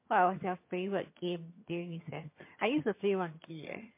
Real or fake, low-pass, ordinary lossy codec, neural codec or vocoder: fake; 3.6 kHz; MP3, 24 kbps; vocoder, 22.05 kHz, 80 mel bands, HiFi-GAN